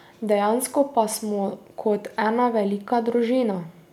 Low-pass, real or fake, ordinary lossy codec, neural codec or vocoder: 19.8 kHz; real; none; none